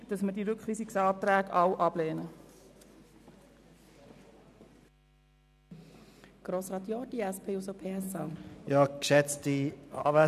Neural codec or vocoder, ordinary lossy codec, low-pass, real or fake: none; none; 14.4 kHz; real